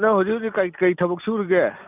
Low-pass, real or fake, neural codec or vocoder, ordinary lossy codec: 3.6 kHz; real; none; none